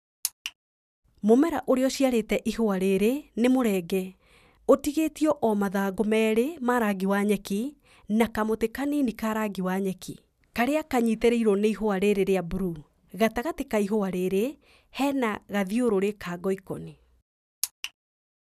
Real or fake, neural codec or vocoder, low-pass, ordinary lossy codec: real; none; 14.4 kHz; none